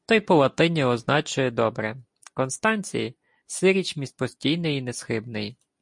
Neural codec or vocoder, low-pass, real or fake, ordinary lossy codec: none; 10.8 kHz; real; MP3, 48 kbps